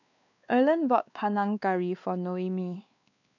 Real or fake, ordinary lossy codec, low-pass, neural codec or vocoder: fake; none; 7.2 kHz; codec, 16 kHz, 2 kbps, X-Codec, WavLM features, trained on Multilingual LibriSpeech